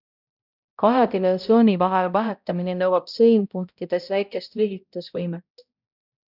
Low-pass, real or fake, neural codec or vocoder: 5.4 kHz; fake; codec, 16 kHz, 0.5 kbps, X-Codec, HuBERT features, trained on balanced general audio